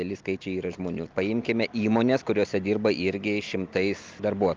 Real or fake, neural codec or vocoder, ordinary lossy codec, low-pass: real; none; Opus, 24 kbps; 7.2 kHz